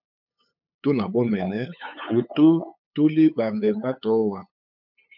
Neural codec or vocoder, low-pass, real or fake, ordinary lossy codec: codec, 16 kHz, 8 kbps, FunCodec, trained on LibriTTS, 25 frames a second; 5.4 kHz; fake; MP3, 48 kbps